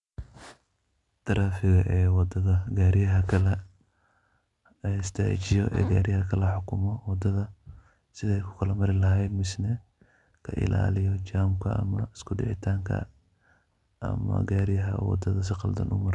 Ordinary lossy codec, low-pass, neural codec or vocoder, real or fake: MP3, 96 kbps; 10.8 kHz; none; real